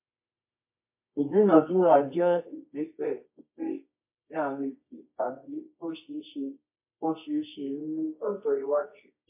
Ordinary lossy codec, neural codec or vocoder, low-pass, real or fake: MP3, 24 kbps; codec, 24 kHz, 0.9 kbps, WavTokenizer, medium music audio release; 3.6 kHz; fake